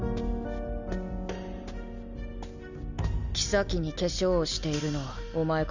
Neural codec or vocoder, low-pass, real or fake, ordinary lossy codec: none; 7.2 kHz; real; none